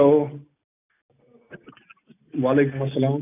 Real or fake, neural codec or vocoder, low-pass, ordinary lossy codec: real; none; 3.6 kHz; none